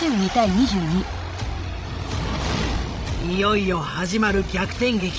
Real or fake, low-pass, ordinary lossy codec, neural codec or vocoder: fake; none; none; codec, 16 kHz, 16 kbps, FreqCodec, larger model